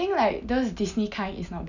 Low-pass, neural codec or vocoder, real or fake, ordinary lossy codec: 7.2 kHz; none; real; none